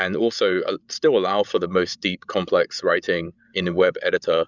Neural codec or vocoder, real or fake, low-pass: codec, 16 kHz, 16 kbps, FreqCodec, larger model; fake; 7.2 kHz